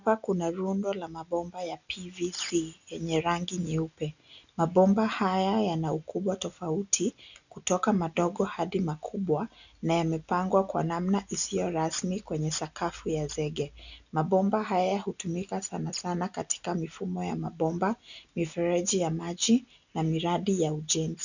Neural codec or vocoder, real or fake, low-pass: none; real; 7.2 kHz